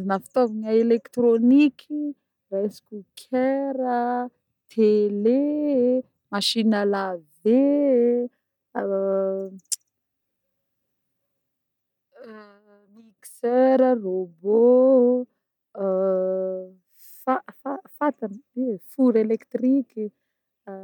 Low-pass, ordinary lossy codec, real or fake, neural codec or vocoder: 19.8 kHz; none; real; none